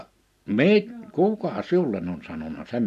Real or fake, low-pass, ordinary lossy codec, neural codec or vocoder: real; 14.4 kHz; none; none